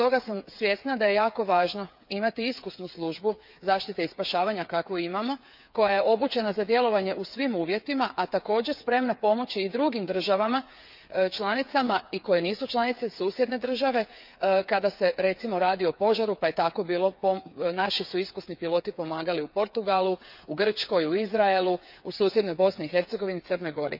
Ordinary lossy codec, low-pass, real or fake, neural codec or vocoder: none; 5.4 kHz; fake; codec, 16 kHz, 8 kbps, FreqCodec, smaller model